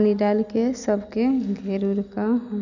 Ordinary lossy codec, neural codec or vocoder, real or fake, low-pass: none; none; real; 7.2 kHz